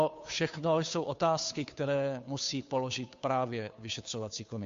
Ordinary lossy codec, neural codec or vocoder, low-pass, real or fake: MP3, 48 kbps; codec, 16 kHz, 2 kbps, FunCodec, trained on Chinese and English, 25 frames a second; 7.2 kHz; fake